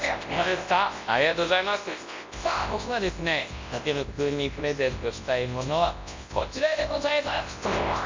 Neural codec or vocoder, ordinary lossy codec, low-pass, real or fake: codec, 24 kHz, 0.9 kbps, WavTokenizer, large speech release; MP3, 48 kbps; 7.2 kHz; fake